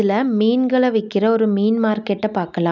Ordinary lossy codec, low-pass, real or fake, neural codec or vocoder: none; 7.2 kHz; real; none